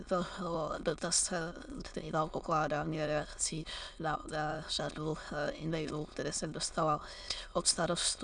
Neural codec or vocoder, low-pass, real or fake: autoencoder, 22.05 kHz, a latent of 192 numbers a frame, VITS, trained on many speakers; 9.9 kHz; fake